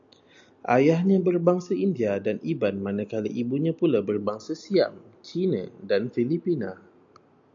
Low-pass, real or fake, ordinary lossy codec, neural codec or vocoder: 7.2 kHz; real; AAC, 64 kbps; none